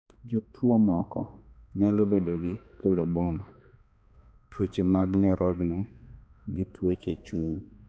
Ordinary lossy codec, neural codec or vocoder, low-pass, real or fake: none; codec, 16 kHz, 2 kbps, X-Codec, HuBERT features, trained on balanced general audio; none; fake